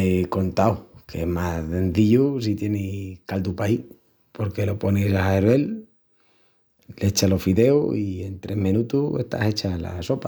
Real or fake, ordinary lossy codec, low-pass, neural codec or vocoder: real; none; none; none